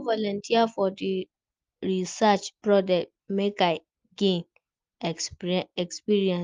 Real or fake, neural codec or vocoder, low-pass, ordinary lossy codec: real; none; 7.2 kHz; Opus, 32 kbps